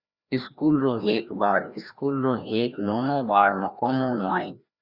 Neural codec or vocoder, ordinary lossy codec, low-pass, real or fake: codec, 16 kHz, 1 kbps, FreqCodec, larger model; Opus, 64 kbps; 5.4 kHz; fake